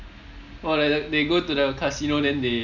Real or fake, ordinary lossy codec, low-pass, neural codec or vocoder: real; none; 7.2 kHz; none